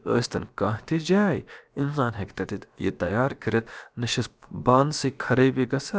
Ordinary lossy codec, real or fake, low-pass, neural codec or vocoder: none; fake; none; codec, 16 kHz, about 1 kbps, DyCAST, with the encoder's durations